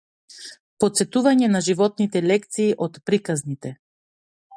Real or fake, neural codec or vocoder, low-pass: real; none; 9.9 kHz